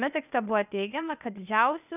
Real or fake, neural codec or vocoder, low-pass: fake; codec, 16 kHz, 0.8 kbps, ZipCodec; 3.6 kHz